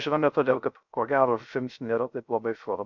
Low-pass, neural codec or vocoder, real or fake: 7.2 kHz; codec, 16 kHz, 0.3 kbps, FocalCodec; fake